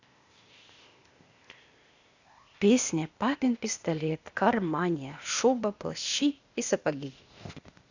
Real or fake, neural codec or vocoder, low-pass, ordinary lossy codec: fake; codec, 16 kHz, 0.8 kbps, ZipCodec; 7.2 kHz; Opus, 64 kbps